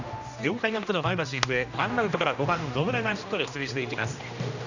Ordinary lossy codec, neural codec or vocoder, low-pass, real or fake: none; codec, 16 kHz, 1 kbps, X-Codec, HuBERT features, trained on general audio; 7.2 kHz; fake